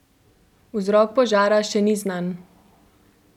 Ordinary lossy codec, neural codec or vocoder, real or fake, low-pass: none; none; real; 19.8 kHz